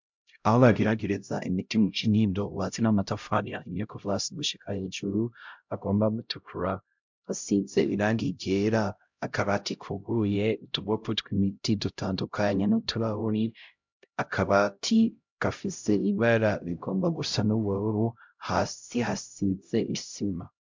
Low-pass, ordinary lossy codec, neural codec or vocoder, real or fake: 7.2 kHz; MP3, 64 kbps; codec, 16 kHz, 0.5 kbps, X-Codec, HuBERT features, trained on LibriSpeech; fake